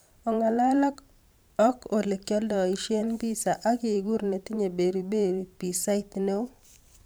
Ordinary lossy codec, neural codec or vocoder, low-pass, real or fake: none; vocoder, 44.1 kHz, 128 mel bands every 512 samples, BigVGAN v2; none; fake